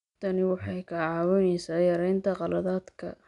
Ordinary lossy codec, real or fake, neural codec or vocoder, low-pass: none; real; none; 10.8 kHz